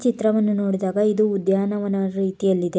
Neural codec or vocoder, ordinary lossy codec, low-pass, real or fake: none; none; none; real